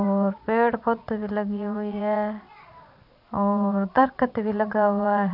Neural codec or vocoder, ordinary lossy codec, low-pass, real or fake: vocoder, 22.05 kHz, 80 mel bands, Vocos; none; 5.4 kHz; fake